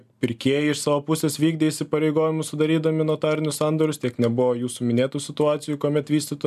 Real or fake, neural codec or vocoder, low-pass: real; none; 14.4 kHz